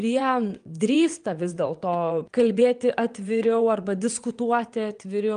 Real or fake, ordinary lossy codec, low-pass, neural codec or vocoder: fake; Opus, 32 kbps; 9.9 kHz; vocoder, 22.05 kHz, 80 mel bands, WaveNeXt